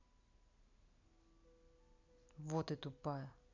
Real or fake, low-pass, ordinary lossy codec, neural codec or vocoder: real; 7.2 kHz; none; none